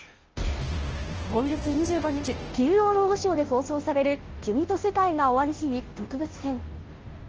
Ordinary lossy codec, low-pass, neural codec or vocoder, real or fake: Opus, 16 kbps; 7.2 kHz; codec, 16 kHz, 0.5 kbps, FunCodec, trained on Chinese and English, 25 frames a second; fake